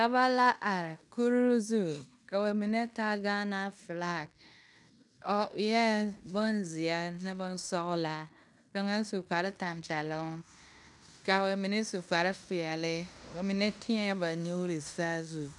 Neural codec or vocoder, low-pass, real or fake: codec, 16 kHz in and 24 kHz out, 0.9 kbps, LongCat-Audio-Codec, fine tuned four codebook decoder; 10.8 kHz; fake